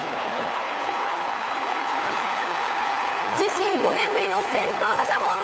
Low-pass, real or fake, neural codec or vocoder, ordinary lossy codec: none; fake; codec, 16 kHz, 4 kbps, FunCodec, trained on LibriTTS, 50 frames a second; none